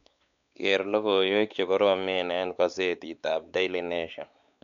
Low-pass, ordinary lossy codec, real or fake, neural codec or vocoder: 7.2 kHz; none; fake; codec, 16 kHz, 4 kbps, X-Codec, WavLM features, trained on Multilingual LibriSpeech